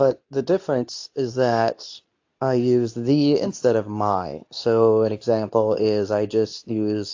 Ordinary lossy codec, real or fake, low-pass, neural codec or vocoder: AAC, 48 kbps; fake; 7.2 kHz; codec, 24 kHz, 0.9 kbps, WavTokenizer, medium speech release version 2